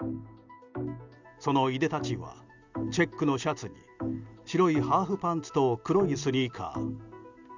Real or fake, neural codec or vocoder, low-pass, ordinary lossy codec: real; none; 7.2 kHz; Opus, 64 kbps